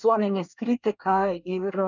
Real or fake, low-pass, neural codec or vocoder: fake; 7.2 kHz; codec, 24 kHz, 1 kbps, SNAC